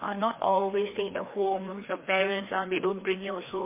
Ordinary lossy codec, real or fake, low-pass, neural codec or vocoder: MP3, 24 kbps; fake; 3.6 kHz; codec, 16 kHz, 2 kbps, FreqCodec, larger model